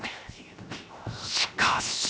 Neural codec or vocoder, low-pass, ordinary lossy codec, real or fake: codec, 16 kHz, 0.7 kbps, FocalCodec; none; none; fake